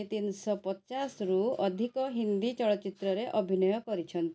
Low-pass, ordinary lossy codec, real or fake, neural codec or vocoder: none; none; real; none